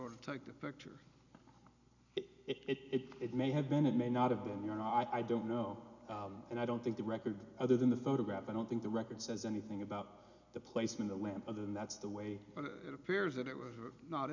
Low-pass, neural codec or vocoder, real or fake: 7.2 kHz; none; real